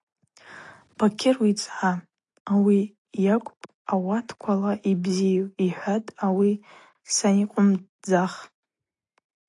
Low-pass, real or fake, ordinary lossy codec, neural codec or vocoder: 10.8 kHz; real; AAC, 48 kbps; none